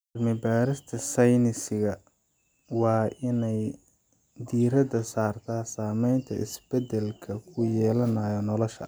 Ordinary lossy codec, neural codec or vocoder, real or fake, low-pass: none; none; real; none